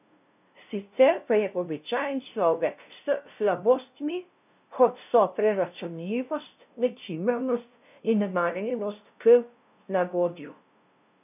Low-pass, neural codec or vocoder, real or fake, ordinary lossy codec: 3.6 kHz; codec, 16 kHz, 0.5 kbps, FunCodec, trained on LibriTTS, 25 frames a second; fake; none